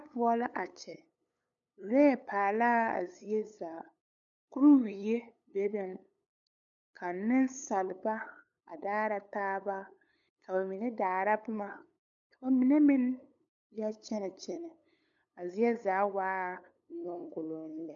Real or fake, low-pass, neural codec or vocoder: fake; 7.2 kHz; codec, 16 kHz, 8 kbps, FunCodec, trained on LibriTTS, 25 frames a second